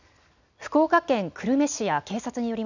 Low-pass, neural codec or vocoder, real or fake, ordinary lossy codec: 7.2 kHz; none; real; none